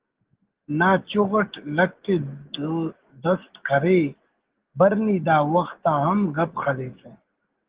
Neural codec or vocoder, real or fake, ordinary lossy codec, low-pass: none; real; Opus, 16 kbps; 3.6 kHz